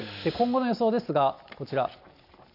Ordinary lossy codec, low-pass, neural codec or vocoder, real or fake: none; 5.4 kHz; none; real